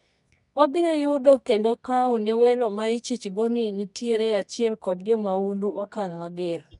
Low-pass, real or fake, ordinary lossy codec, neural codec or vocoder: 10.8 kHz; fake; none; codec, 24 kHz, 0.9 kbps, WavTokenizer, medium music audio release